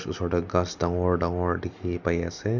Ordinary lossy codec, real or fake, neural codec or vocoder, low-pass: none; real; none; 7.2 kHz